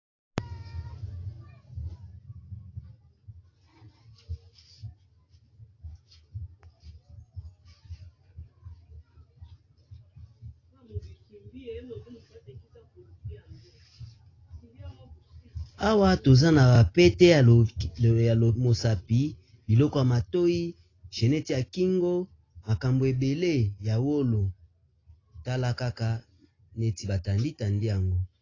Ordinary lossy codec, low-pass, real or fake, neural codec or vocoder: AAC, 32 kbps; 7.2 kHz; real; none